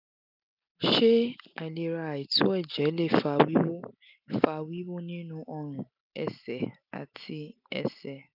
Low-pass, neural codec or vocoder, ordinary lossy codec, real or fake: 5.4 kHz; none; none; real